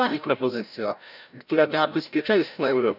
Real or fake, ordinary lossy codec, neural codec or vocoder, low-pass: fake; none; codec, 16 kHz, 0.5 kbps, FreqCodec, larger model; 5.4 kHz